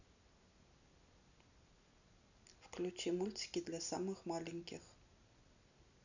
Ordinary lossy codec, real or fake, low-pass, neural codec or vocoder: none; real; 7.2 kHz; none